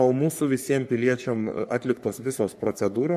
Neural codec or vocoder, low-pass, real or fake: codec, 44.1 kHz, 3.4 kbps, Pupu-Codec; 14.4 kHz; fake